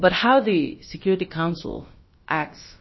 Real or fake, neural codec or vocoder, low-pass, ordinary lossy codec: fake; codec, 16 kHz, about 1 kbps, DyCAST, with the encoder's durations; 7.2 kHz; MP3, 24 kbps